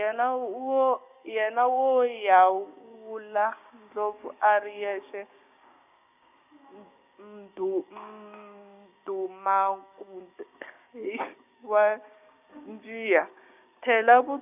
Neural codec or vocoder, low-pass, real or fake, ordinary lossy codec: codec, 16 kHz, 6 kbps, DAC; 3.6 kHz; fake; none